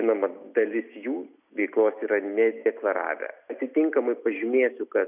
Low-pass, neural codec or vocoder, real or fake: 3.6 kHz; none; real